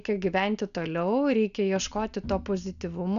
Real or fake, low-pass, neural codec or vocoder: real; 7.2 kHz; none